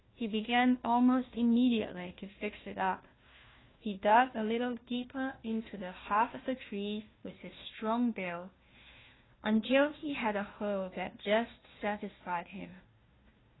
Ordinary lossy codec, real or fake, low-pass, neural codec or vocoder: AAC, 16 kbps; fake; 7.2 kHz; codec, 16 kHz, 1 kbps, FunCodec, trained on Chinese and English, 50 frames a second